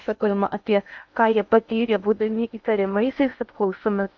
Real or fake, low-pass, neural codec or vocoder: fake; 7.2 kHz; codec, 16 kHz in and 24 kHz out, 0.6 kbps, FocalCodec, streaming, 2048 codes